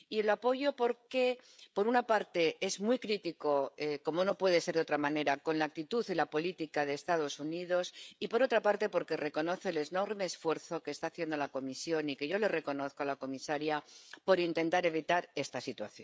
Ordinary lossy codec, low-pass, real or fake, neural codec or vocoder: none; none; fake; codec, 16 kHz, 16 kbps, FreqCodec, smaller model